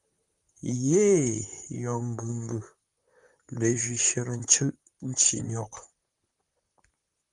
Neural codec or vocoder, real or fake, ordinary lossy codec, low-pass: vocoder, 44.1 kHz, 128 mel bands, Pupu-Vocoder; fake; Opus, 32 kbps; 10.8 kHz